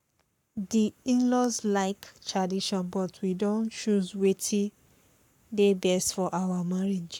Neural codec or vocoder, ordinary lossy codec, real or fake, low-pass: codec, 44.1 kHz, 7.8 kbps, Pupu-Codec; none; fake; 19.8 kHz